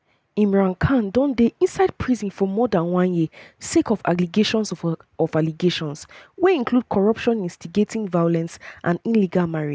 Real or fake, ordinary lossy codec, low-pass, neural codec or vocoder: real; none; none; none